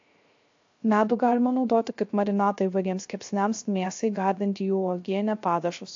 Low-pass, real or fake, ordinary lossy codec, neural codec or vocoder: 7.2 kHz; fake; AAC, 48 kbps; codec, 16 kHz, 0.3 kbps, FocalCodec